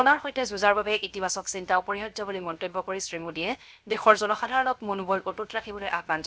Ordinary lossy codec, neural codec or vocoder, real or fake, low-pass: none; codec, 16 kHz, 0.7 kbps, FocalCodec; fake; none